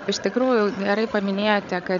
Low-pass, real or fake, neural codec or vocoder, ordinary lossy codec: 7.2 kHz; fake; codec, 16 kHz, 8 kbps, FreqCodec, larger model; MP3, 96 kbps